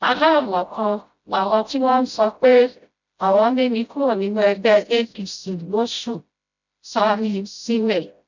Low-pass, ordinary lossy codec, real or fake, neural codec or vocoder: 7.2 kHz; none; fake; codec, 16 kHz, 0.5 kbps, FreqCodec, smaller model